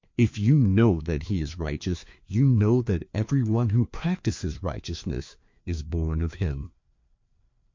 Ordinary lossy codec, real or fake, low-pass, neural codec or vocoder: MP3, 48 kbps; fake; 7.2 kHz; codec, 16 kHz, 2 kbps, FreqCodec, larger model